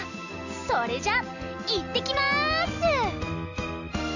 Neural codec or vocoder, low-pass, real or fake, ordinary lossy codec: none; 7.2 kHz; real; none